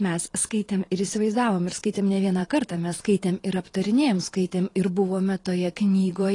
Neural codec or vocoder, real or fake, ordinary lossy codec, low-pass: vocoder, 44.1 kHz, 128 mel bands every 512 samples, BigVGAN v2; fake; AAC, 32 kbps; 10.8 kHz